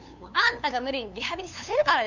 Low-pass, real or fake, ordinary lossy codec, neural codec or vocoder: 7.2 kHz; fake; none; codec, 16 kHz, 2 kbps, FunCodec, trained on LibriTTS, 25 frames a second